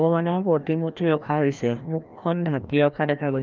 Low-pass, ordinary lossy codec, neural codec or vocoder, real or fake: 7.2 kHz; Opus, 24 kbps; codec, 16 kHz, 1 kbps, FreqCodec, larger model; fake